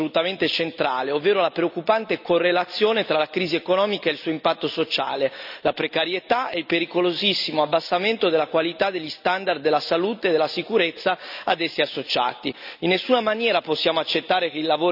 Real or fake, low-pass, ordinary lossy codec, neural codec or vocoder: real; 5.4 kHz; none; none